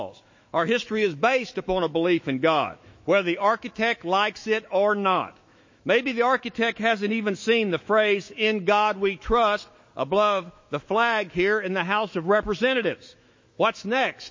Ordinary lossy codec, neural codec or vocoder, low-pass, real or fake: MP3, 32 kbps; autoencoder, 48 kHz, 128 numbers a frame, DAC-VAE, trained on Japanese speech; 7.2 kHz; fake